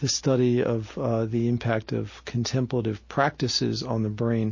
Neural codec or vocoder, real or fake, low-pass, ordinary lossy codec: none; real; 7.2 kHz; MP3, 32 kbps